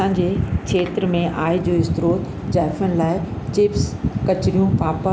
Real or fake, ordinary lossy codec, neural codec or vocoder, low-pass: real; none; none; none